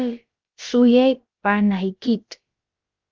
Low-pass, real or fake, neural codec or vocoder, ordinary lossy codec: 7.2 kHz; fake; codec, 16 kHz, about 1 kbps, DyCAST, with the encoder's durations; Opus, 24 kbps